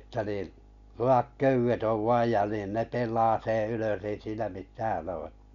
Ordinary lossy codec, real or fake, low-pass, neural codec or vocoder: none; real; 7.2 kHz; none